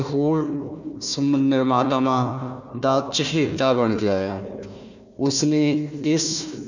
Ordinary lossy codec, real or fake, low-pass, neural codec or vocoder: none; fake; 7.2 kHz; codec, 16 kHz, 1 kbps, FunCodec, trained on Chinese and English, 50 frames a second